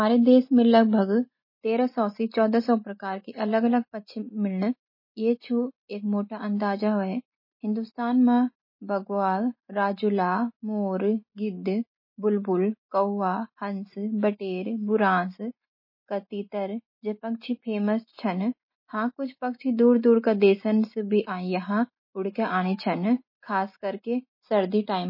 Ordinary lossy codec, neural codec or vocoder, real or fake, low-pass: MP3, 24 kbps; none; real; 5.4 kHz